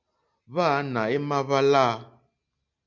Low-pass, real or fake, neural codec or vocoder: 7.2 kHz; real; none